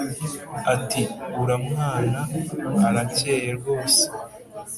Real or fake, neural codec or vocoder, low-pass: real; none; 14.4 kHz